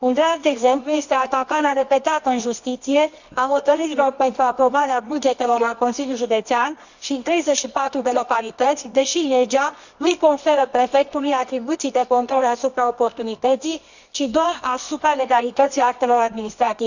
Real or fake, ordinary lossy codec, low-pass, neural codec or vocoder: fake; none; 7.2 kHz; codec, 24 kHz, 0.9 kbps, WavTokenizer, medium music audio release